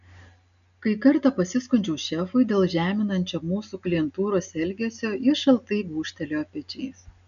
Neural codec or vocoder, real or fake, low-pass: none; real; 7.2 kHz